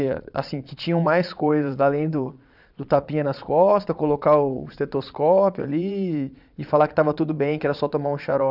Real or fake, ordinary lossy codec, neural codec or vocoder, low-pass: fake; none; vocoder, 22.05 kHz, 80 mel bands, Vocos; 5.4 kHz